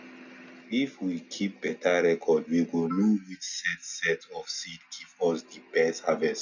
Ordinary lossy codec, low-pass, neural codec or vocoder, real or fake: none; 7.2 kHz; none; real